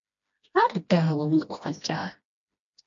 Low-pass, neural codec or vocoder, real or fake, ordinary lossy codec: 7.2 kHz; codec, 16 kHz, 1 kbps, FreqCodec, smaller model; fake; MP3, 48 kbps